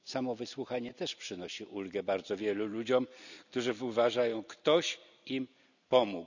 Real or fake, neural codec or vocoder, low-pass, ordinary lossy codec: real; none; 7.2 kHz; none